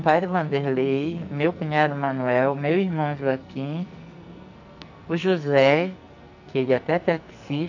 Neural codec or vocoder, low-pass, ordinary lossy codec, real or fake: codec, 44.1 kHz, 2.6 kbps, SNAC; 7.2 kHz; none; fake